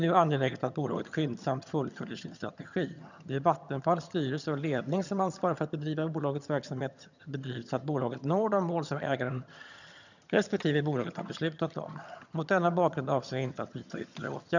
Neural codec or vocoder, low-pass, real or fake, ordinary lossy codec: vocoder, 22.05 kHz, 80 mel bands, HiFi-GAN; 7.2 kHz; fake; none